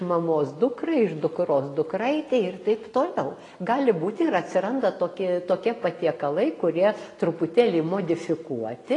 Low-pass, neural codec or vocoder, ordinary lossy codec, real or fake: 10.8 kHz; none; AAC, 32 kbps; real